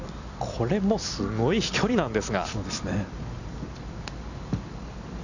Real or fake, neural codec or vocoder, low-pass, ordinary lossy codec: fake; vocoder, 44.1 kHz, 128 mel bands every 256 samples, BigVGAN v2; 7.2 kHz; none